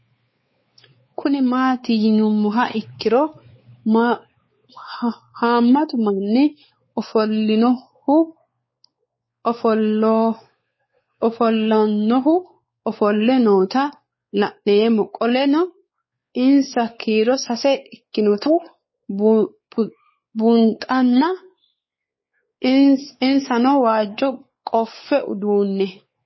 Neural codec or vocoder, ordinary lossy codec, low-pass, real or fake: codec, 16 kHz, 4 kbps, X-Codec, WavLM features, trained on Multilingual LibriSpeech; MP3, 24 kbps; 7.2 kHz; fake